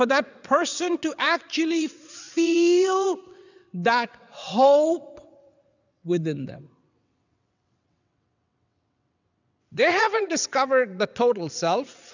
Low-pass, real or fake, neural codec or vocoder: 7.2 kHz; fake; vocoder, 22.05 kHz, 80 mel bands, WaveNeXt